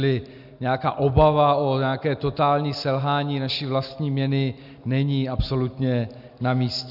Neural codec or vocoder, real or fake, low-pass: none; real; 5.4 kHz